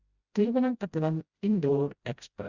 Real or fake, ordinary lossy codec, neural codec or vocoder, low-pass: fake; Opus, 64 kbps; codec, 16 kHz, 0.5 kbps, FreqCodec, smaller model; 7.2 kHz